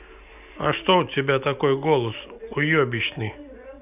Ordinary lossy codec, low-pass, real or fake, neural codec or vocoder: none; 3.6 kHz; real; none